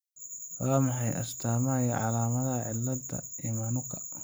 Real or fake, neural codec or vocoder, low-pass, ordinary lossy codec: real; none; none; none